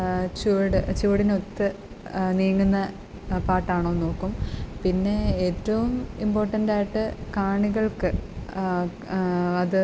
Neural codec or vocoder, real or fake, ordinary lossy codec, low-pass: none; real; none; none